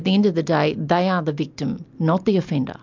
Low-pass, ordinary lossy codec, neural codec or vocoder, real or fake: 7.2 kHz; MP3, 64 kbps; none; real